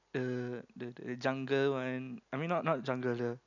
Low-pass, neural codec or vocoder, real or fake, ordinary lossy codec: 7.2 kHz; none; real; none